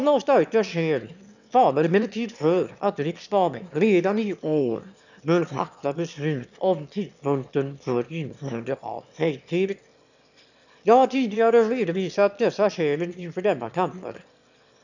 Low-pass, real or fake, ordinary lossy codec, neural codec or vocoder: 7.2 kHz; fake; none; autoencoder, 22.05 kHz, a latent of 192 numbers a frame, VITS, trained on one speaker